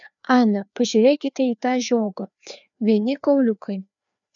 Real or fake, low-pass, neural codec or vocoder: fake; 7.2 kHz; codec, 16 kHz, 2 kbps, FreqCodec, larger model